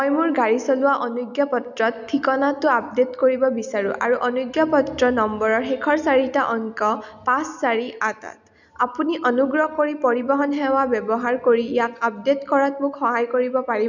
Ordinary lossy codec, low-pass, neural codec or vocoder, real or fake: none; 7.2 kHz; none; real